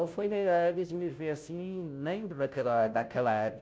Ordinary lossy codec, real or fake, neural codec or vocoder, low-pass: none; fake; codec, 16 kHz, 0.5 kbps, FunCodec, trained on Chinese and English, 25 frames a second; none